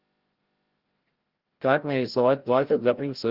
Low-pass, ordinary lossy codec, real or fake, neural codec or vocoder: 5.4 kHz; Opus, 16 kbps; fake; codec, 16 kHz, 0.5 kbps, FreqCodec, larger model